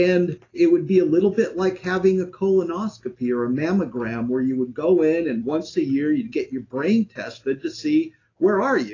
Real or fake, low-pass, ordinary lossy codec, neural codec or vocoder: real; 7.2 kHz; AAC, 32 kbps; none